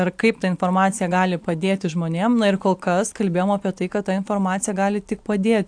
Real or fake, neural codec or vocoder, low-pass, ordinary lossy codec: real; none; 9.9 kHz; AAC, 64 kbps